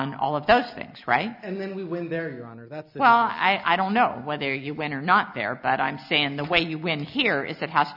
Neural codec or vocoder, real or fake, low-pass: none; real; 5.4 kHz